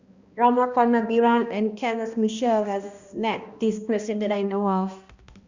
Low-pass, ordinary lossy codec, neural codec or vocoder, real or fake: 7.2 kHz; Opus, 64 kbps; codec, 16 kHz, 1 kbps, X-Codec, HuBERT features, trained on balanced general audio; fake